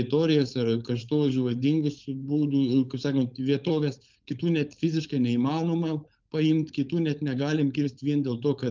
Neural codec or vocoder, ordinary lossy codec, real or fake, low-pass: codec, 16 kHz, 4.8 kbps, FACodec; Opus, 24 kbps; fake; 7.2 kHz